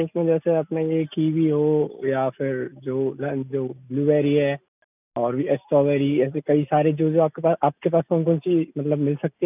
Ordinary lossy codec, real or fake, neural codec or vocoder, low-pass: none; real; none; 3.6 kHz